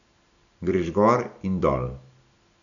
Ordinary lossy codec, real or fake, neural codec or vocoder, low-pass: none; real; none; 7.2 kHz